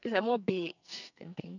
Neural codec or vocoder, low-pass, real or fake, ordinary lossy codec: codec, 44.1 kHz, 2.6 kbps, SNAC; 7.2 kHz; fake; none